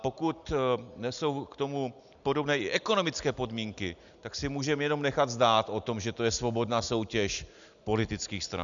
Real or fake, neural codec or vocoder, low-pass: real; none; 7.2 kHz